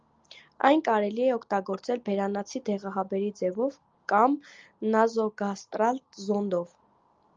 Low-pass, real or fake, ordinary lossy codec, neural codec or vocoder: 7.2 kHz; real; Opus, 24 kbps; none